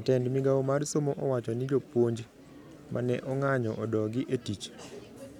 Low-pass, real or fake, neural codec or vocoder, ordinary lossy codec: 19.8 kHz; real; none; none